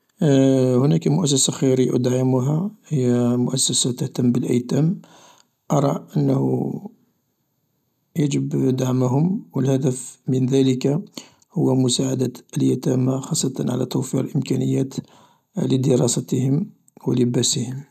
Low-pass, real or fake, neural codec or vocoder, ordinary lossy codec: 14.4 kHz; real; none; none